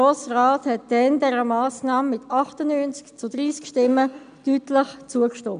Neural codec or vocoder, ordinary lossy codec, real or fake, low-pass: none; none; real; 9.9 kHz